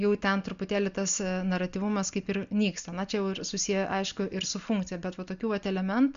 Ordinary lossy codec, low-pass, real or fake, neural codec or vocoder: Opus, 64 kbps; 7.2 kHz; real; none